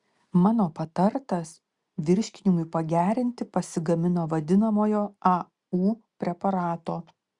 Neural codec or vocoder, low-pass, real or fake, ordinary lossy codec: none; 10.8 kHz; real; Opus, 64 kbps